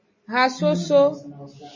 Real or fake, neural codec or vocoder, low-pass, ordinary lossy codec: real; none; 7.2 kHz; MP3, 32 kbps